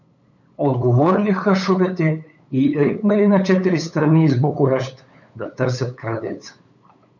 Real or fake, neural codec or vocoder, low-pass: fake; codec, 16 kHz, 8 kbps, FunCodec, trained on LibriTTS, 25 frames a second; 7.2 kHz